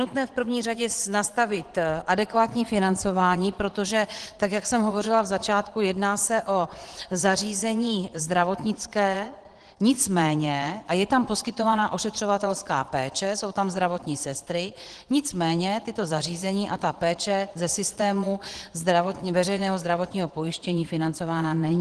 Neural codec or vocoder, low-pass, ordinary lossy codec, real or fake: vocoder, 22.05 kHz, 80 mel bands, Vocos; 9.9 kHz; Opus, 16 kbps; fake